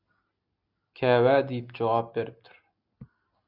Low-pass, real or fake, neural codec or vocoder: 5.4 kHz; real; none